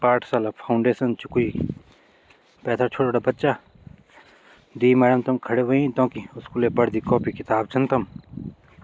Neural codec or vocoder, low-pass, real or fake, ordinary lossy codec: none; none; real; none